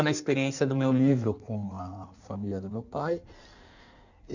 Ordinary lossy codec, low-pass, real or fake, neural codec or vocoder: none; 7.2 kHz; fake; codec, 16 kHz in and 24 kHz out, 1.1 kbps, FireRedTTS-2 codec